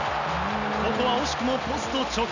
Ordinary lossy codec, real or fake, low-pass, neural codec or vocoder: none; real; 7.2 kHz; none